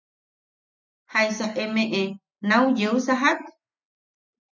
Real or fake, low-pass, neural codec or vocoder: real; 7.2 kHz; none